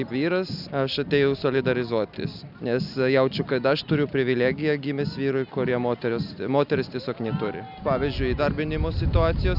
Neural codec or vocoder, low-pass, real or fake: none; 5.4 kHz; real